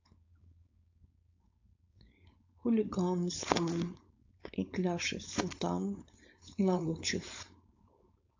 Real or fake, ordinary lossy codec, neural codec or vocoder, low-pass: fake; AAC, 48 kbps; codec, 16 kHz, 4.8 kbps, FACodec; 7.2 kHz